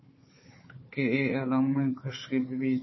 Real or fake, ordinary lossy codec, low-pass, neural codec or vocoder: fake; MP3, 24 kbps; 7.2 kHz; codec, 16 kHz, 4 kbps, FunCodec, trained on Chinese and English, 50 frames a second